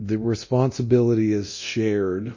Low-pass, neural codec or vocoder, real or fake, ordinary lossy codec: 7.2 kHz; codec, 24 kHz, 0.9 kbps, DualCodec; fake; MP3, 32 kbps